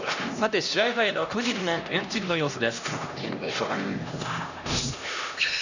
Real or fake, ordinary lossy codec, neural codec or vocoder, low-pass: fake; none; codec, 16 kHz, 1 kbps, X-Codec, HuBERT features, trained on LibriSpeech; 7.2 kHz